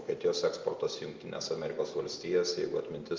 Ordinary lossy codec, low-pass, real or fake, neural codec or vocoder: Opus, 32 kbps; 7.2 kHz; real; none